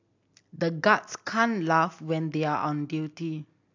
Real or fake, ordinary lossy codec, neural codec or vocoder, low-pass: real; AAC, 48 kbps; none; 7.2 kHz